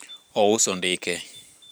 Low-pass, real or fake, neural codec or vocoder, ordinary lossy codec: none; fake; vocoder, 44.1 kHz, 128 mel bands every 512 samples, BigVGAN v2; none